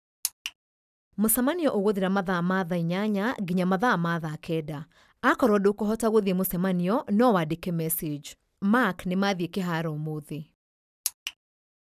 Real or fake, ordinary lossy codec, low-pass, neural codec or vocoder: real; none; 14.4 kHz; none